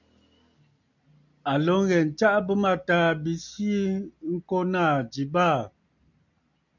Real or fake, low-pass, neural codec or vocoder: real; 7.2 kHz; none